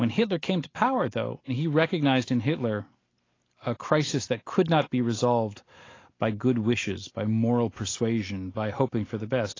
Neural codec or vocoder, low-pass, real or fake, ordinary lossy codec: none; 7.2 kHz; real; AAC, 32 kbps